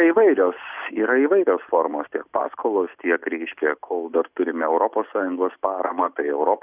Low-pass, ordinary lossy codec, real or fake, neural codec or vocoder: 3.6 kHz; Opus, 64 kbps; real; none